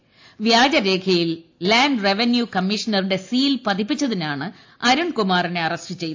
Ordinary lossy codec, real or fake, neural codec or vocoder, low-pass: AAC, 48 kbps; real; none; 7.2 kHz